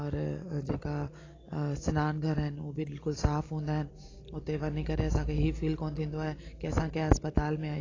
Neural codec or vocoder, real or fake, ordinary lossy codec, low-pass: none; real; AAC, 32 kbps; 7.2 kHz